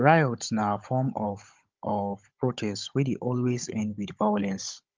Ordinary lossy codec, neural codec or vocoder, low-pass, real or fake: Opus, 24 kbps; codec, 16 kHz, 16 kbps, FunCodec, trained on Chinese and English, 50 frames a second; 7.2 kHz; fake